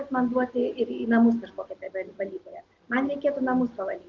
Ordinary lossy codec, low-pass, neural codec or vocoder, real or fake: Opus, 32 kbps; 7.2 kHz; none; real